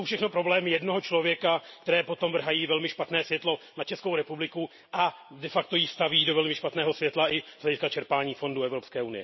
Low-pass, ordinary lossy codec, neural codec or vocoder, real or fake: 7.2 kHz; MP3, 24 kbps; none; real